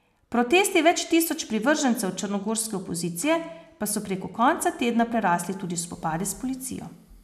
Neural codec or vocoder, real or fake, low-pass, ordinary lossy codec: none; real; 14.4 kHz; none